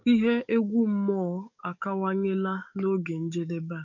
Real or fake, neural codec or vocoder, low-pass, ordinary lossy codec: fake; codec, 44.1 kHz, 7.8 kbps, DAC; 7.2 kHz; none